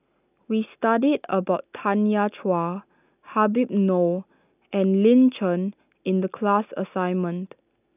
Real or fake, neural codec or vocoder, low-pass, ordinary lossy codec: real; none; 3.6 kHz; none